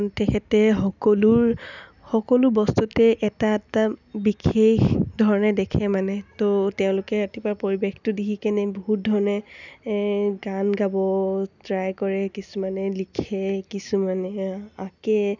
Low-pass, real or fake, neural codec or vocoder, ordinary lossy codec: 7.2 kHz; real; none; none